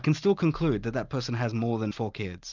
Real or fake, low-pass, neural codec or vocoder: real; 7.2 kHz; none